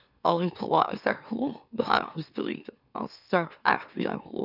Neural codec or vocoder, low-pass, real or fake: autoencoder, 44.1 kHz, a latent of 192 numbers a frame, MeloTTS; 5.4 kHz; fake